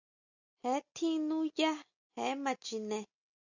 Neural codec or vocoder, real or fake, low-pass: none; real; 7.2 kHz